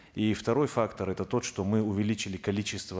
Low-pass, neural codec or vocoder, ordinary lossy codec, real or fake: none; none; none; real